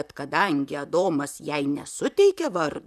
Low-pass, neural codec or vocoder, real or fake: 14.4 kHz; vocoder, 44.1 kHz, 128 mel bands, Pupu-Vocoder; fake